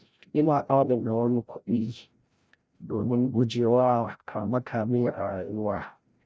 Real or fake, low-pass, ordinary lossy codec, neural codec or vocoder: fake; none; none; codec, 16 kHz, 0.5 kbps, FreqCodec, larger model